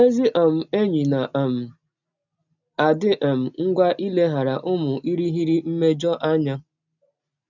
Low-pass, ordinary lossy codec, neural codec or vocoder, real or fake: 7.2 kHz; none; none; real